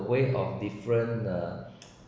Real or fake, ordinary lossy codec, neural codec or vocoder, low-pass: real; none; none; none